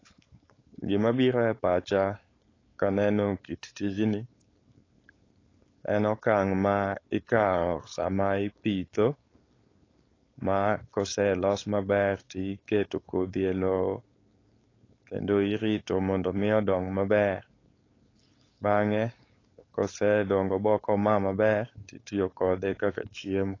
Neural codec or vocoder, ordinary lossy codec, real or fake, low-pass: codec, 16 kHz, 4.8 kbps, FACodec; AAC, 32 kbps; fake; 7.2 kHz